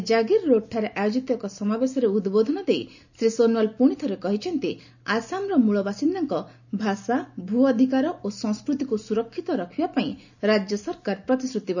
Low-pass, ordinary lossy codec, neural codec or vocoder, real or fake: 7.2 kHz; none; none; real